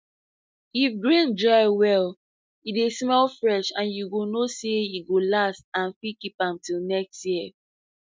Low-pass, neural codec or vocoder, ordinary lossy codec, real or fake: none; none; none; real